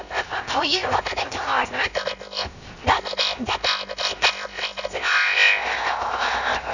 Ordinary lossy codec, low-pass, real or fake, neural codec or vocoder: none; 7.2 kHz; fake; codec, 16 kHz, 0.7 kbps, FocalCodec